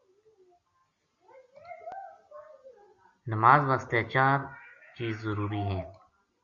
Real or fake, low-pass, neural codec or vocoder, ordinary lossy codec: real; 7.2 kHz; none; Opus, 64 kbps